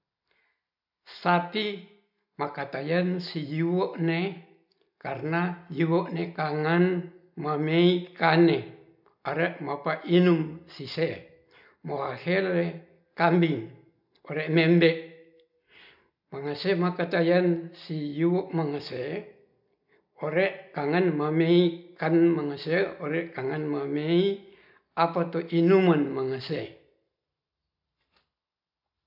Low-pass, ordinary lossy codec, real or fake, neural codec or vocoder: 5.4 kHz; none; real; none